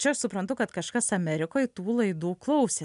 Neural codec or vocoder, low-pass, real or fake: none; 10.8 kHz; real